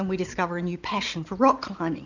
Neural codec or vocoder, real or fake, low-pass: none; real; 7.2 kHz